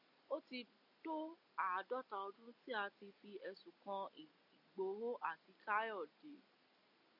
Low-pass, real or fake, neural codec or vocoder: 5.4 kHz; real; none